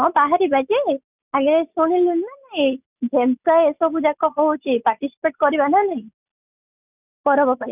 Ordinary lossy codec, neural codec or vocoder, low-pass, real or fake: none; none; 3.6 kHz; real